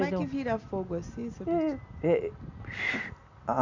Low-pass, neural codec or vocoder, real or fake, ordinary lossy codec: 7.2 kHz; none; real; none